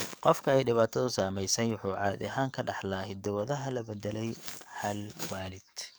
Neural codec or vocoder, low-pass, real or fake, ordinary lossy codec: codec, 44.1 kHz, 7.8 kbps, DAC; none; fake; none